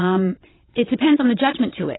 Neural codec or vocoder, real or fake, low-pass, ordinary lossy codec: vocoder, 22.05 kHz, 80 mel bands, WaveNeXt; fake; 7.2 kHz; AAC, 16 kbps